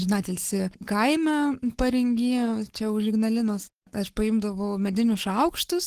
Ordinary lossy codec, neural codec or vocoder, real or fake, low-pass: Opus, 16 kbps; codec, 44.1 kHz, 7.8 kbps, Pupu-Codec; fake; 14.4 kHz